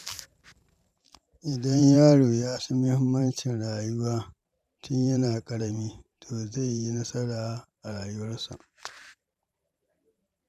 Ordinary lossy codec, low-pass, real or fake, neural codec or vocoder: none; 14.4 kHz; fake; vocoder, 44.1 kHz, 128 mel bands every 512 samples, BigVGAN v2